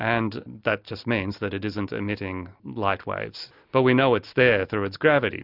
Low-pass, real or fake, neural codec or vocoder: 5.4 kHz; real; none